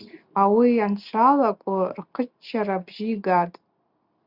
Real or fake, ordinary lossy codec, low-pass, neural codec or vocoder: real; Opus, 64 kbps; 5.4 kHz; none